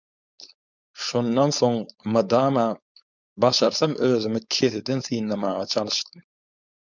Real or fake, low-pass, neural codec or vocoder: fake; 7.2 kHz; codec, 16 kHz, 4.8 kbps, FACodec